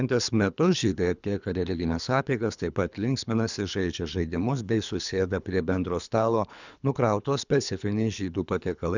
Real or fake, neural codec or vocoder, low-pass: fake; codec, 24 kHz, 3 kbps, HILCodec; 7.2 kHz